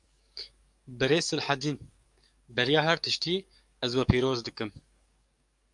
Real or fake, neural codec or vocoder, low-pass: fake; codec, 44.1 kHz, 7.8 kbps, DAC; 10.8 kHz